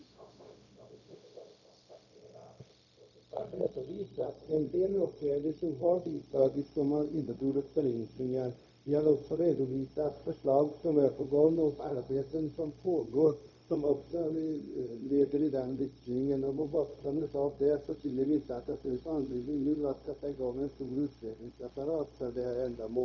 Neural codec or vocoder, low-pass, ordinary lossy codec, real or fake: codec, 16 kHz, 0.4 kbps, LongCat-Audio-Codec; 7.2 kHz; none; fake